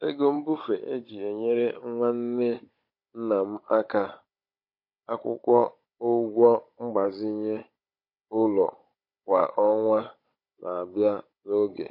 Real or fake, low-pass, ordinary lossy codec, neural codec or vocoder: fake; 5.4 kHz; MP3, 32 kbps; codec, 16 kHz, 16 kbps, FunCodec, trained on Chinese and English, 50 frames a second